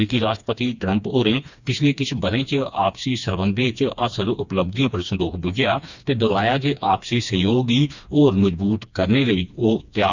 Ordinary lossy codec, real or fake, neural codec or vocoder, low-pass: Opus, 64 kbps; fake; codec, 16 kHz, 2 kbps, FreqCodec, smaller model; 7.2 kHz